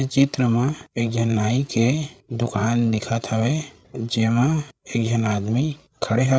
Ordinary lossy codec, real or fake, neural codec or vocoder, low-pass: none; fake; codec, 16 kHz, 8 kbps, FreqCodec, larger model; none